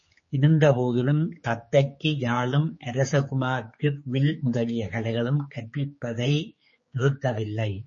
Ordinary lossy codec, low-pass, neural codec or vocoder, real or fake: MP3, 32 kbps; 7.2 kHz; codec, 16 kHz, 4 kbps, X-Codec, HuBERT features, trained on general audio; fake